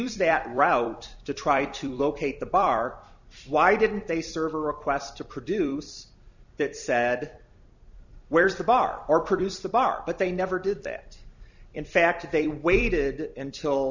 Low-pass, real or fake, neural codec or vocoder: 7.2 kHz; fake; vocoder, 44.1 kHz, 128 mel bands every 256 samples, BigVGAN v2